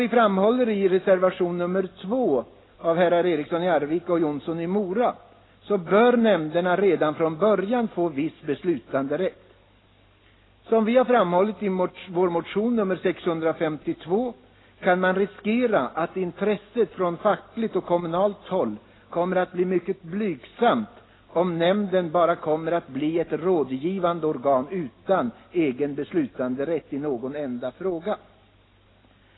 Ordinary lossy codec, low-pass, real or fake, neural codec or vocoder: AAC, 16 kbps; 7.2 kHz; real; none